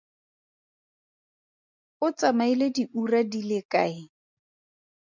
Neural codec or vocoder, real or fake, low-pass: none; real; 7.2 kHz